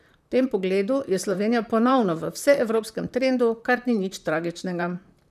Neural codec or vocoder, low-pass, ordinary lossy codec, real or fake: vocoder, 44.1 kHz, 128 mel bands, Pupu-Vocoder; 14.4 kHz; none; fake